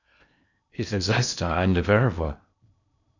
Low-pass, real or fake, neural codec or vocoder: 7.2 kHz; fake; codec, 16 kHz in and 24 kHz out, 0.8 kbps, FocalCodec, streaming, 65536 codes